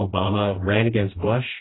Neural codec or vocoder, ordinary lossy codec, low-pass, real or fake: codec, 16 kHz, 1 kbps, FreqCodec, smaller model; AAC, 16 kbps; 7.2 kHz; fake